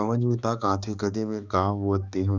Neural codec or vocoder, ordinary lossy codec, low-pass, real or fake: codec, 16 kHz, 2 kbps, X-Codec, HuBERT features, trained on balanced general audio; Opus, 64 kbps; 7.2 kHz; fake